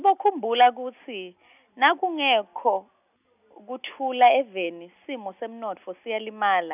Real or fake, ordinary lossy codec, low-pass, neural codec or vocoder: real; none; 3.6 kHz; none